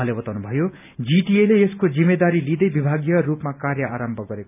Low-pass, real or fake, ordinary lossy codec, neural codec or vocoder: 3.6 kHz; real; none; none